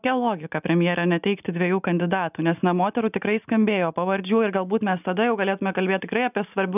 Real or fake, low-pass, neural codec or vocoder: real; 3.6 kHz; none